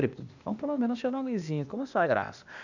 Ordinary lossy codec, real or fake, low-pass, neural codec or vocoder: none; fake; 7.2 kHz; codec, 16 kHz, 0.8 kbps, ZipCodec